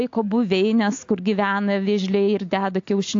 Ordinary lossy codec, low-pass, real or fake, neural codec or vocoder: AAC, 48 kbps; 7.2 kHz; real; none